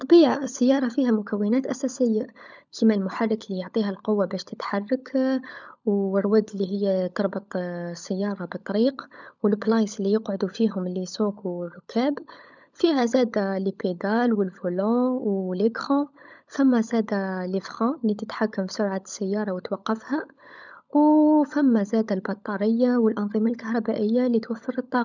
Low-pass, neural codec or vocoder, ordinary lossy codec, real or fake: 7.2 kHz; codec, 16 kHz, 16 kbps, FunCodec, trained on LibriTTS, 50 frames a second; none; fake